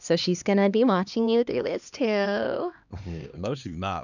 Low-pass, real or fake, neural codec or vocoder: 7.2 kHz; fake; codec, 16 kHz, 2 kbps, X-Codec, HuBERT features, trained on LibriSpeech